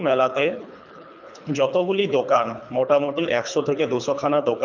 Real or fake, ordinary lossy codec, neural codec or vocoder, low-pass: fake; none; codec, 24 kHz, 3 kbps, HILCodec; 7.2 kHz